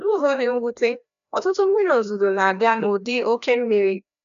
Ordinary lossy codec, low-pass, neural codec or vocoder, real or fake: MP3, 96 kbps; 7.2 kHz; codec, 16 kHz, 1 kbps, FreqCodec, larger model; fake